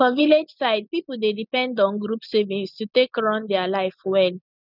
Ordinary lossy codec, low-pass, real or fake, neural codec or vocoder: none; 5.4 kHz; real; none